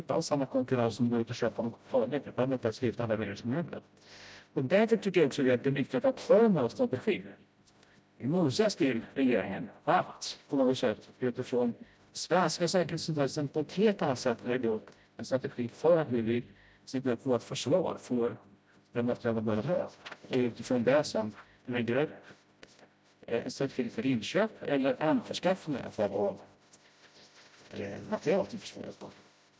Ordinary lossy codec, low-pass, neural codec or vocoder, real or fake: none; none; codec, 16 kHz, 0.5 kbps, FreqCodec, smaller model; fake